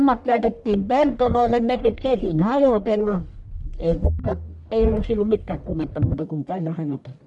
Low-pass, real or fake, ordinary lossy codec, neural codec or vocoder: 10.8 kHz; fake; none; codec, 44.1 kHz, 1.7 kbps, Pupu-Codec